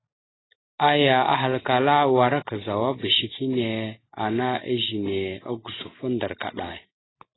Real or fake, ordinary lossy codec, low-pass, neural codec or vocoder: real; AAC, 16 kbps; 7.2 kHz; none